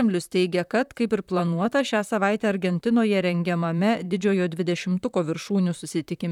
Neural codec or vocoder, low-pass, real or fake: vocoder, 44.1 kHz, 128 mel bands, Pupu-Vocoder; 19.8 kHz; fake